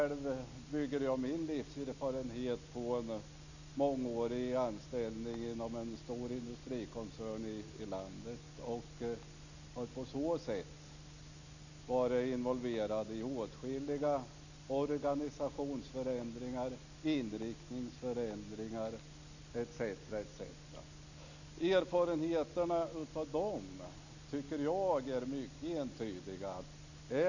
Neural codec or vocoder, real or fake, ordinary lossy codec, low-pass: none; real; none; 7.2 kHz